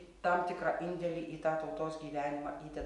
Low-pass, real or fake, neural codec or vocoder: 10.8 kHz; real; none